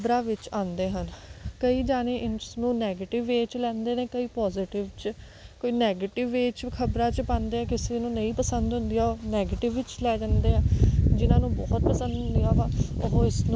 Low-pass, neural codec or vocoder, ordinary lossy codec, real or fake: none; none; none; real